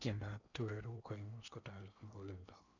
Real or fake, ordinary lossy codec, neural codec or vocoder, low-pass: fake; none; codec, 16 kHz in and 24 kHz out, 0.8 kbps, FocalCodec, streaming, 65536 codes; 7.2 kHz